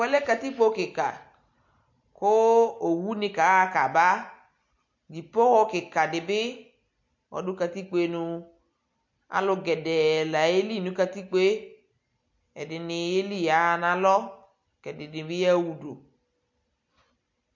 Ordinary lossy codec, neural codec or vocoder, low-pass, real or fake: MP3, 48 kbps; none; 7.2 kHz; real